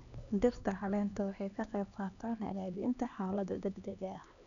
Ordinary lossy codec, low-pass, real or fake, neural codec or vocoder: none; 7.2 kHz; fake; codec, 16 kHz, 2 kbps, X-Codec, HuBERT features, trained on LibriSpeech